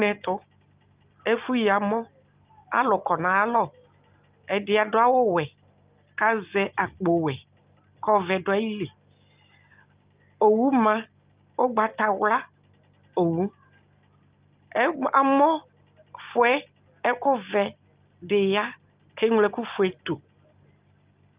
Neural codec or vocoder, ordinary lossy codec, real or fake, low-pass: none; Opus, 32 kbps; real; 3.6 kHz